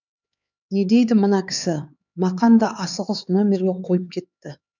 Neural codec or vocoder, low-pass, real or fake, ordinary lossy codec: codec, 16 kHz, 4 kbps, X-Codec, HuBERT features, trained on LibriSpeech; 7.2 kHz; fake; none